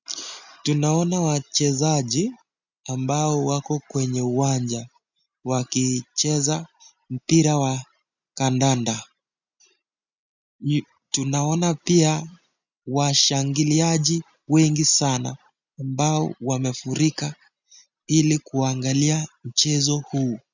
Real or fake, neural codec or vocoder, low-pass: real; none; 7.2 kHz